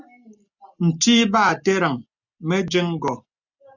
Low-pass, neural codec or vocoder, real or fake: 7.2 kHz; none; real